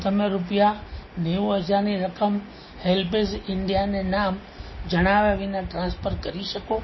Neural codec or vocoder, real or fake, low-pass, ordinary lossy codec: none; real; 7.2 kHz; MP3, 24 kbps